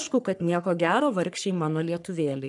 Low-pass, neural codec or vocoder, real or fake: 10.8 kHz; codec, 44.1 kHz, 3.4 kbps, Pupu-Codec; fake